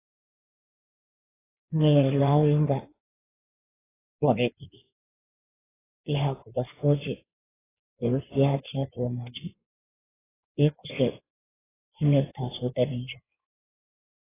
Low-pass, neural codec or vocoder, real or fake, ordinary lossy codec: 3.6 kHz; codec, 16 kHz in and 24 kHz out, 1.1 kbps, FireRedTTS-2 codec; fake; AAC, 16 kbps